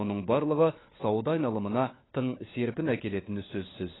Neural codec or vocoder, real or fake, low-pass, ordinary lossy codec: codec, 16 kHz, 4 kbps, FunCodec, trained on LibriTTS, 50 frames a second; fake; 7.2 kHz; AAC, 16 kbps